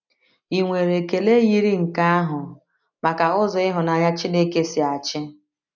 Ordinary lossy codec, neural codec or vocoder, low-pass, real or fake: none; none; 7.2 kHz; real